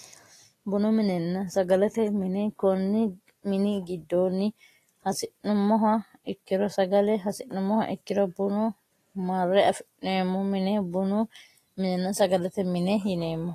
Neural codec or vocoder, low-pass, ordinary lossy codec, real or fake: none; 14.4 kHz; AAC, 48 kbps; real